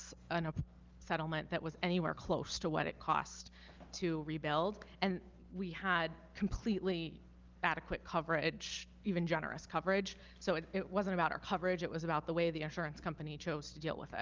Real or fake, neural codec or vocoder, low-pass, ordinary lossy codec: real; none; 7.2 kHz; Opus, 32 kbps